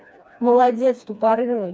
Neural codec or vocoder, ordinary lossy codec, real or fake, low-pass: codec, 16 kHz, 2 kbps, FreqCodec, smaller model; none; fake; none